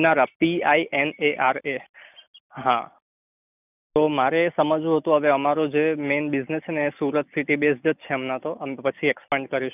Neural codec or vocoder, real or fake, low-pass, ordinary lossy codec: none; real; 3.6 kHz; none